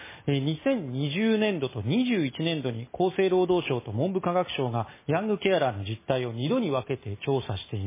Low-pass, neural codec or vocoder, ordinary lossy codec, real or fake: 3.6 kHz; none; MP3, 16 kbps; real